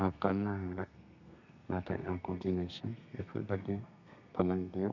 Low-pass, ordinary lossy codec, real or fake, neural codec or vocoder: 7.2 kHz; none; fake; codec, 32 kHz, 1.9 kbps, SNAC